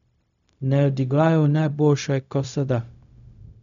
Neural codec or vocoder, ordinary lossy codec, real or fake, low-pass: codec, 16 kHz, 0.4 kbps, LongCat-Audio-Codec; none; fake; 7.2 kHz